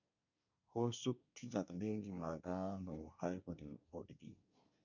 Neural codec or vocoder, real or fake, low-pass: codec, 24 kHz, 1 kbps, SNAC; fake; 7.2 kHz